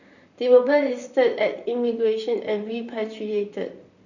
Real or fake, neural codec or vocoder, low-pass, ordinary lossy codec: fake; vocoder, 44.1 kHz, 128 mel bands, Pupu-Vocoder; 7.2 kHz; none